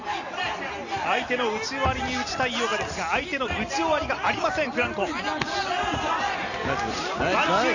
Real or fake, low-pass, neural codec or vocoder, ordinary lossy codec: real; 7.2 kHz; none; none